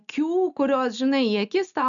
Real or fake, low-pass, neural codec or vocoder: real; 7.2 kHz; none